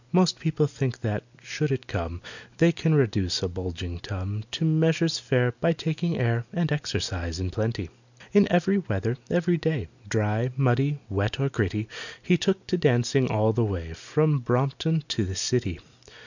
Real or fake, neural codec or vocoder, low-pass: real; none; 7.2 kHz